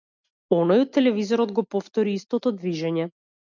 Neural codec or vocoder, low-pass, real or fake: none; 7.2 kHz; real